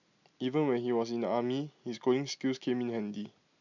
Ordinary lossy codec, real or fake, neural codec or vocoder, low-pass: none; real; none; 7.2 kHz